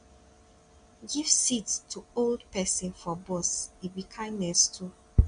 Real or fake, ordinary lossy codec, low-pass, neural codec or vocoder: real; MP3, 64 kbps; 9.9 kHz; none